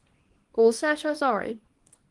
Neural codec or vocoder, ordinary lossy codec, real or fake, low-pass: codec, 24 kHz, 0.9 kbps, WavTokenizer, small release; Opus, 24 kbps; fake; 10.8 kHz